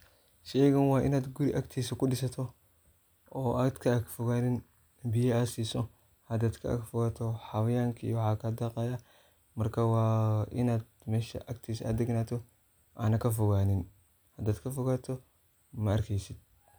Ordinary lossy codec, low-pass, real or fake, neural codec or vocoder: none; none; real; none